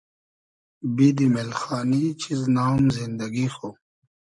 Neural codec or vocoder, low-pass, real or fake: none; 10.8 kHz; real